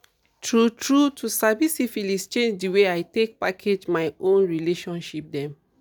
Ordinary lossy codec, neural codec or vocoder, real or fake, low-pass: none; none; real; none